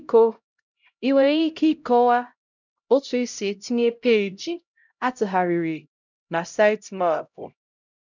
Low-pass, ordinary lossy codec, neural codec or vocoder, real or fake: 7.2 kHz; none; codec, 16 kHz, 0.5 kbps, X-Codec, HuBERT features, trained on LibriSpeech; fake